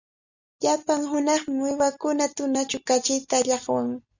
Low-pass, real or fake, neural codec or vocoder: 7.2 kHz; real; none